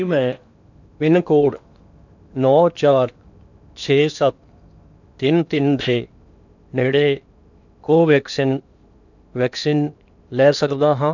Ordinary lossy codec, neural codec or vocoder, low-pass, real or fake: none; codec, 16 kHz in and 24 kHz out, 0.8 kbps, FocalCodec, streaming, 65536 codes; 7.2 kHz; fake